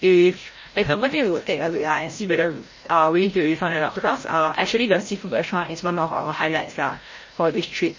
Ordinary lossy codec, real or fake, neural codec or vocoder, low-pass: MP3, 32 kbps; fake; codec, 16 kHz, 0.5 kbps, FreqCodec, larger model; 7.2 kHz